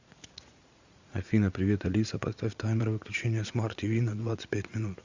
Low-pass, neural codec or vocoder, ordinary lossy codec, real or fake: 7.2 kHz; vocoder, 44.1 kHz, 80 mel bands, Vocos; Opus, 64 kbps; fake